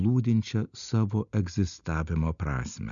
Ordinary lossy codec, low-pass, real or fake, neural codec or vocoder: MP3, 96 kbps; 7.2 kHz; real; none